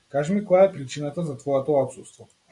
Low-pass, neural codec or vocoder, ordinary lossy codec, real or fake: 10.8 kHz; none; AAC, 64 kbps; real